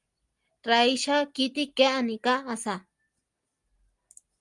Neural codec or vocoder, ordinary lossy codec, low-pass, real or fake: none; Opus, 32 kbps; 10.8 kHz; real